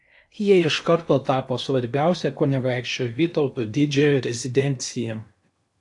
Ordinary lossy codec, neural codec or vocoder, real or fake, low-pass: AAC, 64 kbps; codec, 16 kHz in and 24 kHz out, 0.8 kbps, FocalCodec, streaming, 65536 codes; fake; 10.8 kHz